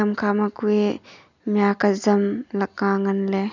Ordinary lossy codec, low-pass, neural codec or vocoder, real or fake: none; 7.2 kHz; none; real